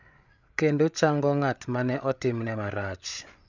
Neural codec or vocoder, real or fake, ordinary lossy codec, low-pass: codec, 16 kHz, 8 kbps, FreqCodec, larger model; fake; none; 7.2 kHz